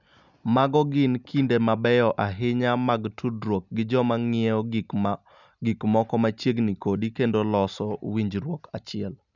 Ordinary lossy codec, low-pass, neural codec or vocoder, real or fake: none; 7.2 kHz; none; real